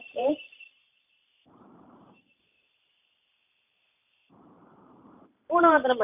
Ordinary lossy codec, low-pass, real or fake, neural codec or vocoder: none; 3.6 kHz; fake; vocoder, 44.1 kHz, 128 mel bands every 512 samples, BigVGAN v2